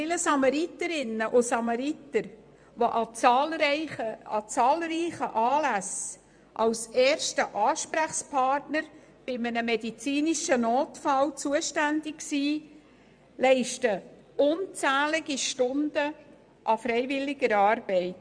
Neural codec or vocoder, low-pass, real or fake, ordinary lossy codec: vocoder, 24 kHz, 100 mel bands, Vocos; 9.9 kHz; fake; MP3, 96 kbps